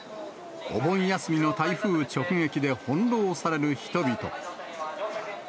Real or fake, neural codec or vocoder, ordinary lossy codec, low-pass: real; none; none; none